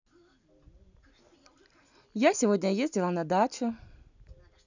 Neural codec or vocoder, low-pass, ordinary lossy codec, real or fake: none; 7.2 kHz; none; real